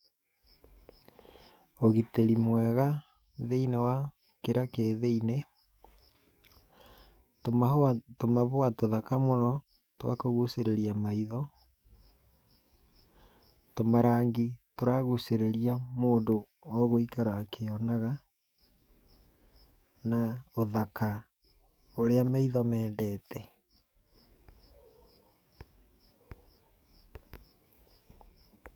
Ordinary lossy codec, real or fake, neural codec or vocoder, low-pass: none; fake; codec, 44.1 kHz, 7.8 kbps, DAC; 19.8 kHz